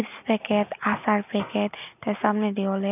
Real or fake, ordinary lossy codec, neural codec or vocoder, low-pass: real; none; none; 3.6 kHz